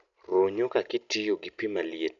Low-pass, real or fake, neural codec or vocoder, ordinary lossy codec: 7.2 kHz; real; none; none